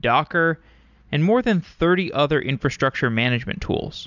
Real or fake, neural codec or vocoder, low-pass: real; none; 7.2 kHz